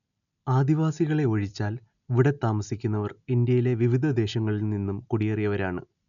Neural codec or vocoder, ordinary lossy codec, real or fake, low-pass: none; none; real; 7.2 kHz